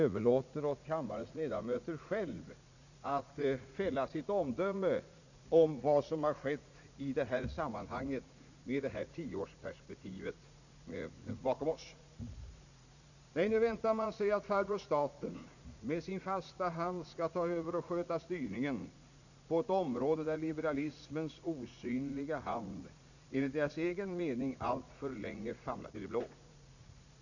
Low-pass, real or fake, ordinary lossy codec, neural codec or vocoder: 7.2 kHz; fake; none; vocoder, 44.1 kHz, 80 mel bands, Vocos